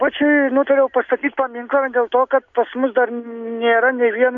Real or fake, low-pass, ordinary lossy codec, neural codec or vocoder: real; 7.2 kHz; AAC, 64 kbps; none